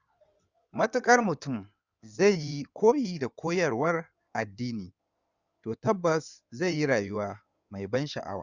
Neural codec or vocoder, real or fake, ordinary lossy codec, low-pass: codec, 16 kHz in and 24 kHz out, 2.2 kbps, FireRedTTS-2 codec; fake; Opus, 64 kbps; 7.2 kHz